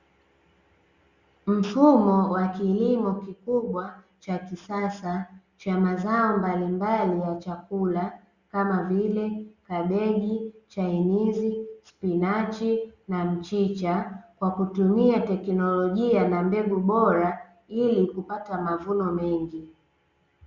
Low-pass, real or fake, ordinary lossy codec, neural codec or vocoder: 7.2 kHz; real; Opus, 64 kbps; none